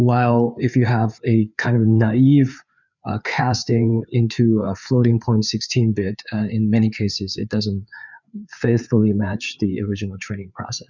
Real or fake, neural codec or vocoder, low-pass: fake; codec, 16 kHz, 4 kbps, FreqCodec, larger model; 7.2 kHz